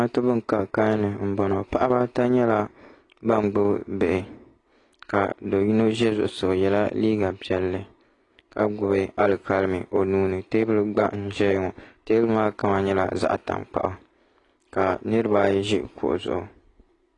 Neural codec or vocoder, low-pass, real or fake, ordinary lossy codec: none; 10.8 kHz; real; AAC, 32 kbps